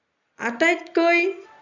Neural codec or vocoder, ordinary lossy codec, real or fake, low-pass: none; none; real; 7.2 kHz